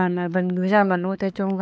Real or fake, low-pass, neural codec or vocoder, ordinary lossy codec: fake; none; codec, 16 kHz, 4 kbps, X-Codec, HuBERT features, trained on balanced general audio; none